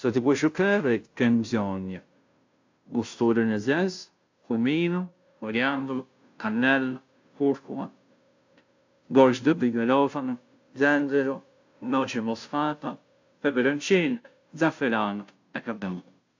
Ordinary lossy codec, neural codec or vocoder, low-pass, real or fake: AAC, 48 kbps; codec, 16 kHz, 0.5 kbps, FunCodec, trained on Chinese and English, 25 frames a second; 7.2 kHz; fake